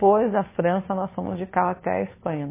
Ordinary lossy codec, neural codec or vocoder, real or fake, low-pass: MP3, 16 kbps; codec, 16 kHz, 2 kbps, FunCodec, trained on Chinese and English, 25 frames a second; fake; 3.6 kHz